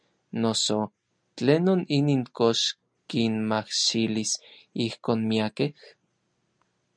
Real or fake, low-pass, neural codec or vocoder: real; 9.9 kHz; none